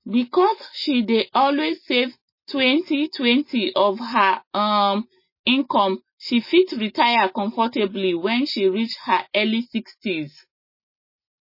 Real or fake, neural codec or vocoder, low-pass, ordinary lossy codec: real; none; 5.4 kHz; MP3, 24 kbps